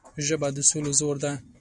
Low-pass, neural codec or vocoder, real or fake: 10.8 kHz; none; real